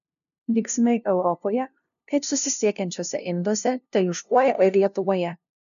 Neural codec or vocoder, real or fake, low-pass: codec, 16 kHz, 0.5 kbps, FunCodec, trained on LibriTTS, 25 frames a second; fake; 7.2 kHz